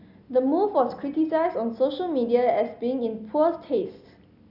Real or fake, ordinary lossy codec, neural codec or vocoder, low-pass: real; Opus, 64 kbps; none; 5.4 kHz